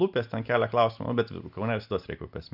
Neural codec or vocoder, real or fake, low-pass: none; real; 5.4 kHz